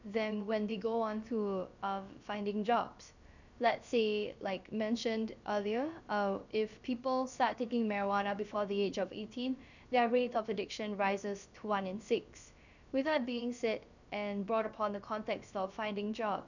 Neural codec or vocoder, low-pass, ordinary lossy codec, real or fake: codec, 16 kHz, about 1 kbps, DyCAST, with the encoder's durations; 7.2 kHz; none; fake